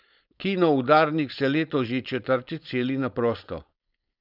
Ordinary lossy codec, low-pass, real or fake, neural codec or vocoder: none; 5.4 kHz; fake; codec, 16 kHz, 4.8 kbps, FACodec